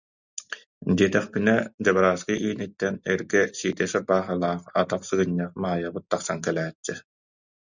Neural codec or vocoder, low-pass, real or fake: none; 7.2 kHz; real